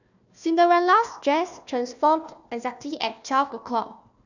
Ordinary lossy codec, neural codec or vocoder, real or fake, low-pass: none; codec, 16 kHz, 1 kbps, FunCodec, trained on Chinese and English, 50 frames a second; fake; 7.2 kHz